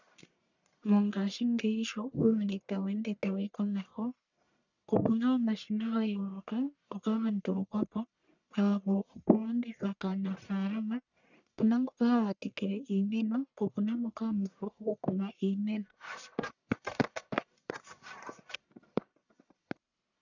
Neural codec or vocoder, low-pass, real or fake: codec, 44.1 kHz, 1.7 kbps, Pupu-Codec; 7.2 kHz; fake